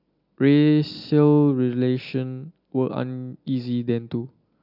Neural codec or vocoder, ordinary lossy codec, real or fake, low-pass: none; AAC, 48 kbps; real; 5.4 kHz